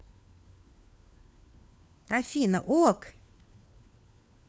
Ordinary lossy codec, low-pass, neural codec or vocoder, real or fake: none; none; codec, 16 kHz, 4 kbps, FunCodec, trained on LibriTTS, 50 frames a second; fake